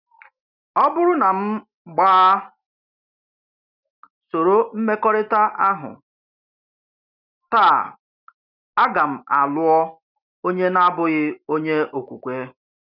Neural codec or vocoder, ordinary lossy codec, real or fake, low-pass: none; none; real; 5.4 kHz